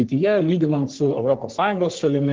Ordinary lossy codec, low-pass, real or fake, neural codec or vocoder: Opus, 16 kbps; 7.2 kHz; fake; codec, 44.1 kHz, 3.4 kbps, Pupu-Codec